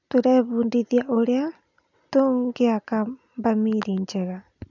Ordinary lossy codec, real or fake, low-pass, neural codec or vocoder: none; real; 7.2 kHz; none